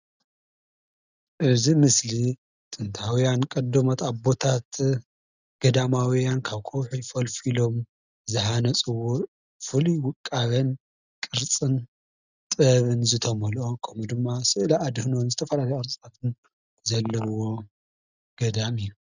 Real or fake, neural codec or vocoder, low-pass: real; none; 7.2 kHz